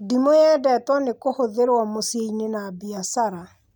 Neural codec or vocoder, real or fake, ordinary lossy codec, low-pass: none; real; none; none